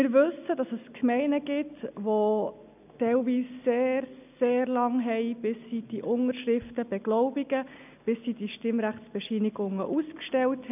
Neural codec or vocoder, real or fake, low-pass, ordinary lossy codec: none; real; 3.6 kHz; none